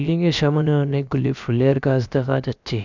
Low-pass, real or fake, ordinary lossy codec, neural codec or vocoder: 7.2 kHz; fake; none; codec, 16 kHz, about 1 kbps, DyCAST, with the encoder's durations